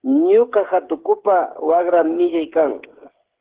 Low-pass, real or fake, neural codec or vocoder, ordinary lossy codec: 3.6 kHz; fake; codec, 16 kHz, 8 kbps, FreqCodec, smaller model; Opus, 16 kbps